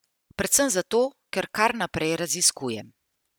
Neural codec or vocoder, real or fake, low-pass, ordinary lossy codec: none; real; none; none